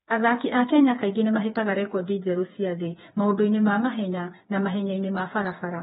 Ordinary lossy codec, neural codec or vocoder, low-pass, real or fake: AAC, 16 kbps; codec, 16 kHz, 4 kbps, FreqCodec, smaller model; 7.2 kHz; fake